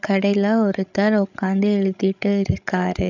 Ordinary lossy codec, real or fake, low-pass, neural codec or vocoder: none; fake; 7.2 kHz; codec, 16 kHz, 16 kbps, FreqCodec, larger model